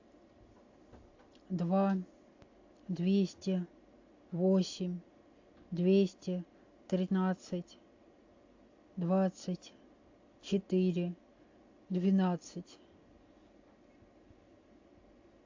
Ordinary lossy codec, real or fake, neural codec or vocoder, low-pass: AAC, 48 kbps; real; none; 7.2 kHz